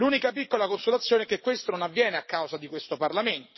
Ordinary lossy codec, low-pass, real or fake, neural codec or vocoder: MP3, 24 kbps; 7.2 kHz; fake; codec, 44.1 kHz, 7.8 kbps, DAC